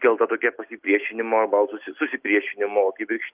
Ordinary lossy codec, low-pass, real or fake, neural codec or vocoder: Opus, 32 kbps; 3.6 kHz; real; none